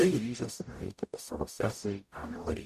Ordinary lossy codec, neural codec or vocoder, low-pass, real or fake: MP3, 96 kbps; codec, 44.1 kHz, 0.9 kbps, DAC; 14.4 kHz; fake